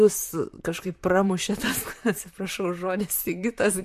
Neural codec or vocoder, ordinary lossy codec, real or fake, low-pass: vocoder, 44.1 kHz, 128 mel bands, Pupu-Vocoder; MP3, 64 kbps; fake; 14.4 kHz